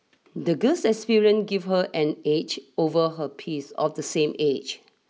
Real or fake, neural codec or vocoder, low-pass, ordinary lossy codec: real; none; none; none